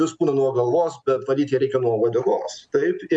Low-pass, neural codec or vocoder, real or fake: 9.9 kHz; none; real